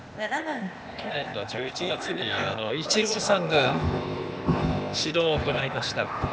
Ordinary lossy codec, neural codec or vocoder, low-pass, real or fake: none; codec, 16 kHz, 0.8 kbps, ZipCodec; none; fake